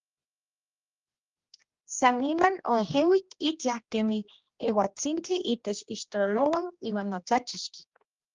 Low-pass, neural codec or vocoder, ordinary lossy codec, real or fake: 7.2 kHz; codec, 16 kHz, 1 kbps, X-Codec, HuBERT features, trained on general audio; Opus, 32 kbps; fake